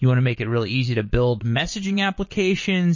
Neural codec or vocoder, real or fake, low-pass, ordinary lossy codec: vocoder, 22.05 kHz, 80 mel bands, Vocos; fake; 7.2 kHz; MP3, 32 kbps